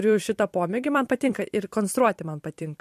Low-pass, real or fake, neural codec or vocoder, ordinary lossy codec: 14.4 kHz; fake; vocoder, 44.1 kHz, 128 mel bands every 256 samples, BigVGAN v2; MP3, 64 kbps